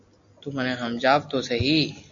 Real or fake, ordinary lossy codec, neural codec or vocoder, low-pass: real; AAC, 64 kbps; none; 7.2 kHz